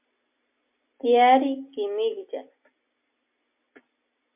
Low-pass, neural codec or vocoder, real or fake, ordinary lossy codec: 3.6 kHz; none; real; MP3, 32 kbps